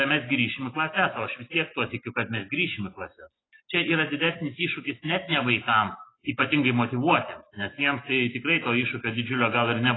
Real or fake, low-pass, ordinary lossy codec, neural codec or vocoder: real; 7.2 kHz; AAC, 16 kbps; none